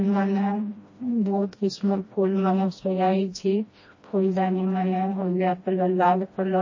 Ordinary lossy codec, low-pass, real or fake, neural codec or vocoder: MP3, 32 kbps; 7.2 kHz; fake; codec, 16 kHz, 1 kbps, FreqCodec, smaller model